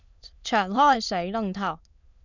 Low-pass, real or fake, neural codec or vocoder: 7.2 kHz; fake; autoencoder, 22.05 kHz, a latent of 192 numbers a frame, VITS, trained on many speakers